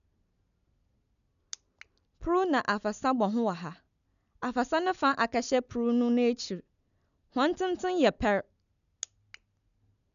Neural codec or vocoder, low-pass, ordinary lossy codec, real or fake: none; 7.2 kHz; none; real